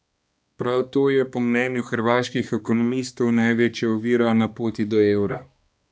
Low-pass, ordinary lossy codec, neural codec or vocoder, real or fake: none; none; codec, 16 kHz, 2 kbps, X-Codec, HuBERT features, trained on balanced general audio; fake